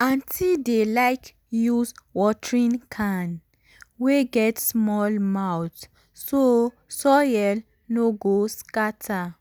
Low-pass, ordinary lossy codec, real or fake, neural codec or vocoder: none; none; real; none